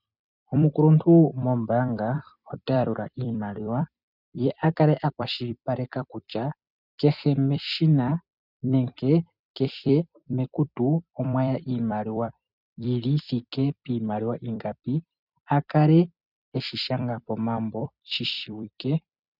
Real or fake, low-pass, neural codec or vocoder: fake; 5.4 kHz; vocoder, 44.1 kHz, 128 mel bands every 256 samples, BigVGAN v2